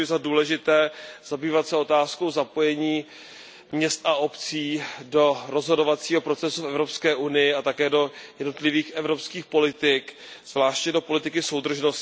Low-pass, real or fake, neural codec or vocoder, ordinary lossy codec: none; real; none; none